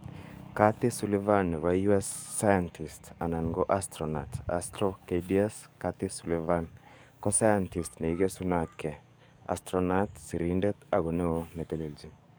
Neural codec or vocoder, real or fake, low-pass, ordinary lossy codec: codec, 44.1 kHz, 7.8 kbps, DAC; fake; none; none